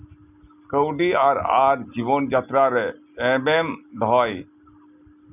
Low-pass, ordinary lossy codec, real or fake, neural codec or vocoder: 3.6 kHz; none; real; none